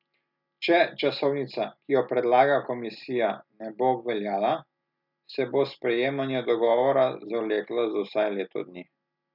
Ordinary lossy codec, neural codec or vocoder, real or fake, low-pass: none; none; real; 5.4 kHz